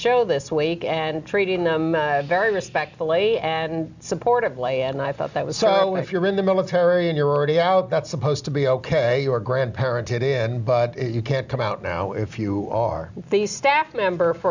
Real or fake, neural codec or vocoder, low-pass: real; none; 7.2 kHz